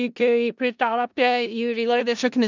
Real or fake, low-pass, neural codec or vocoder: fake; 7.2 kHz; codec, 16 kHz in and 24 kHz out, 0.4 kbps, LongCat-Audio-Codec, four codebook decoder